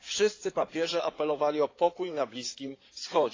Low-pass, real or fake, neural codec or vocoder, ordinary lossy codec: 7.2 kHz; fake; codec, 16 kHz in and 24 kHz out, 2.2 kbps, FireRedTTS-2 codec; AAC, 32 kbps